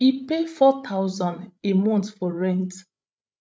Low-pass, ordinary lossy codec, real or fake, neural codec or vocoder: none; none; fake; codec, 16 kHz, 16 kbps, FreqCodec, larger model